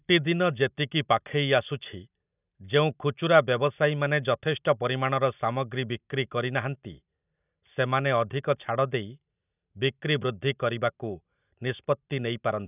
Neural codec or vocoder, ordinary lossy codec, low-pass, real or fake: none; none; 3.6 kHz; real